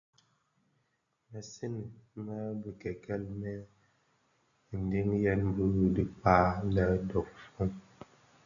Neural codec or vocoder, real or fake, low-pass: none; real; 7.2 kHz